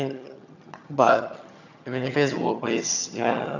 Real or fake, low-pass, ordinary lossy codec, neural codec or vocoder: fake; 7.2 kHz; none; vocoder, 22.05 kHz, 80 mel bands, HiFi-GAN